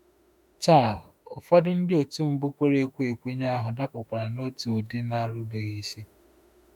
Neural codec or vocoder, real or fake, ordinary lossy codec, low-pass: autoencoder, 48 kHz, 32 numbers a frame, DAC-VAE, trained on Japanese speech; fake; none; 19.8 kHz